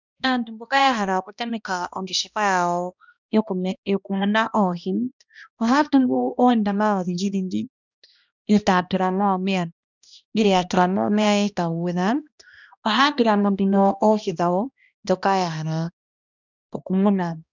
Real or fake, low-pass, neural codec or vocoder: fake; 7.2 kHz; codec, 16 kHz, 1 kbps, X-Codec, HuBERT features, trained on balanced general audio